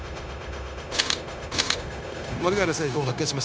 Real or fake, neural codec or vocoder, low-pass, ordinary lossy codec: fake; codec, 16 kHz, 0.9 kbps, LongCat-Audio-Codec; none; none